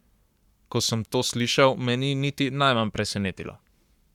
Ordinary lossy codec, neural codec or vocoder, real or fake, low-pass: none; codec, 44.1 kHz, 7.8 kbps, Pupu-Codec; fake; 19.8 kHz